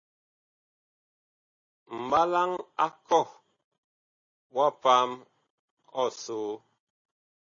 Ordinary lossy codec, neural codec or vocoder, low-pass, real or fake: MP3, 32 kbps; none; 7.2 kHz; real